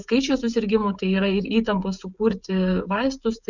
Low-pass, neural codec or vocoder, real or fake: 7.2 kHz; codec, 16 kHz, 16 kbps, FreqCodec, smaller model; fake